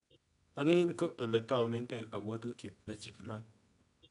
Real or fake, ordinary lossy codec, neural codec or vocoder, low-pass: fake; none; codec, 24 kHz, 0.9 kbps, WavTokenizer, medium music audio release; 10.8 kHz